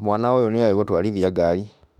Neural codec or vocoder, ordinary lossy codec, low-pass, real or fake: autoencoder, 48 kHz, 32 numbers a frame, DAC-VAE, trained on Japanese speech; none; 19.8 kHz; fake